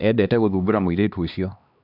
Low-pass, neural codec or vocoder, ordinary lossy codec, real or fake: 5.4 kHz; codec, 16 kHz, 2 kbps, X-Codec, HuBERT features, trained on LibriSpeech; none; fake